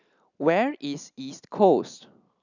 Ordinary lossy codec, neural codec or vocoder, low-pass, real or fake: none; none; 7.2 kHz; real